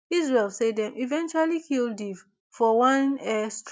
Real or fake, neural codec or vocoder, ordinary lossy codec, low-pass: real; none; none; none